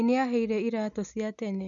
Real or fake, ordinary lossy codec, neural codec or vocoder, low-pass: real; MP3, 96 kbps; none; 7.2 kHz